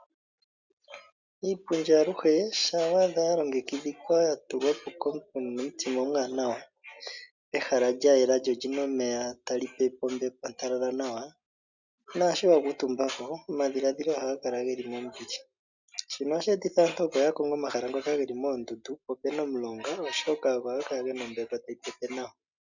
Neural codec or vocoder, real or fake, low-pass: none; real; 7.2 kHz